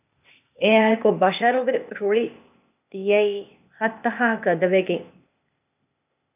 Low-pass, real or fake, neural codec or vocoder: 3.6 kHz; fake; codec, 16 kHz, 0.8 kbps, ZipCodec